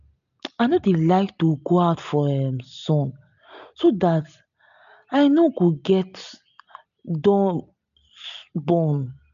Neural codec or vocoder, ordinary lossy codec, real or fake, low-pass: none; none; real; 7.2 kHz